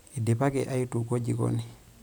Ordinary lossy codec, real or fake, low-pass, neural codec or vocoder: none; real; none; none